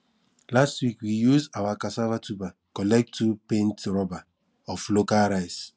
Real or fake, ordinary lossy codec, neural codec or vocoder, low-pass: real; none; none; none